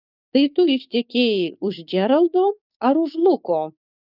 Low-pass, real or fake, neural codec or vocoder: 5.4 kHz; fake; codec, 16 kHz, 6 kbps, DAC